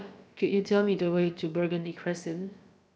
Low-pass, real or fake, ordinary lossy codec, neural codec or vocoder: none; fake; none; codec, 16 kHz, about 1 kbps, DyCAST, with the encoder's durations